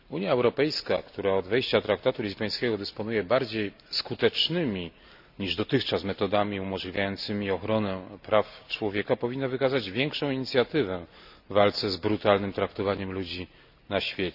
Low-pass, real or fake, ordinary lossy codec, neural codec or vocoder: 5.4 kHz; real; none; none